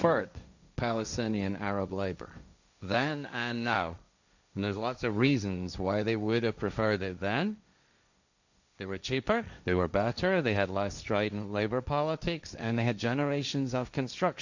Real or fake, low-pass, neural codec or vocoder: fake; 7.2 kHz; codec, 16 kHz, 1.1 kbps, Voila-Tokenizer